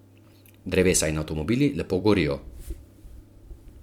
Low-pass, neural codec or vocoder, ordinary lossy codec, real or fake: 19.8 kHz; vocoder, 44.1 kHz, 128 mel bands every 256 samples, BigVGAN v2; MP3, 96 kbps; fake